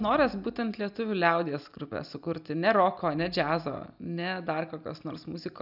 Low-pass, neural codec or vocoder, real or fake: 5.4 kHz; none; real